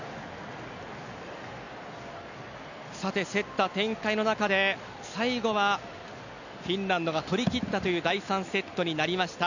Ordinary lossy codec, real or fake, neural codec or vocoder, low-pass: none; real; none; 7.2 kHz